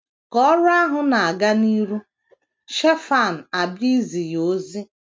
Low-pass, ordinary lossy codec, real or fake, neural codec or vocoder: none; none; real; none